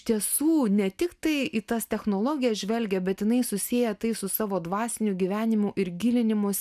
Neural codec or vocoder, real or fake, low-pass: none; real; 14.4 kHz